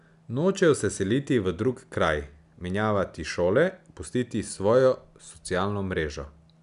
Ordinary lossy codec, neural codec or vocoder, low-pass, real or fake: none; none; 10.8 kHz; real